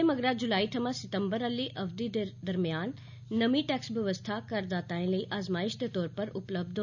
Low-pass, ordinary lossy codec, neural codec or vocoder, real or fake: 7.2 kHz; none; none; real